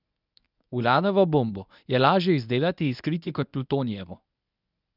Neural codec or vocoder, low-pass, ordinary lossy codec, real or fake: codec, 24 kHz, 0.9 kbps, WavTokenizer, medium speech release version 1; 5.4 kHz; none; fake